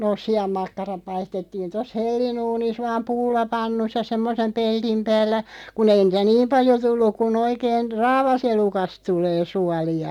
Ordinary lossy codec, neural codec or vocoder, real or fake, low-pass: none; none; real; 19.8 kHz